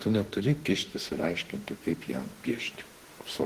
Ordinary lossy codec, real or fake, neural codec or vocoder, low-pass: Opus, 16 kbps; fake; autoencoder, 48 kHz, 32 numbers a frame, DAC-VAE, trained on Japanese speech; 14.4 kHz